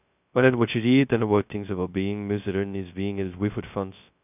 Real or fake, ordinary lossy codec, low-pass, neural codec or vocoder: fake; none; 3.6 kHz; codec, 16 kHz, 0.2 kbps, FocalCodec